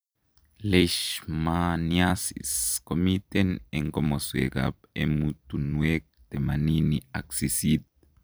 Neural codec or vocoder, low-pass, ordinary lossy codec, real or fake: vocoder, 44.1 kHz, 128 mel bands every 256 samples, BigVGAN v2; none; none; fake